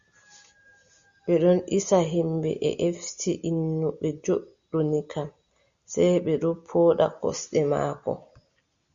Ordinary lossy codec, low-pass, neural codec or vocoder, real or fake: Opus, 64 kbps; 7.2 kHz; none; real